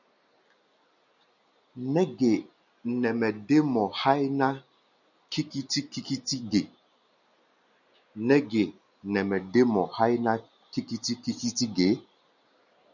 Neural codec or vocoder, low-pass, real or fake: none; 7.2 kHz; real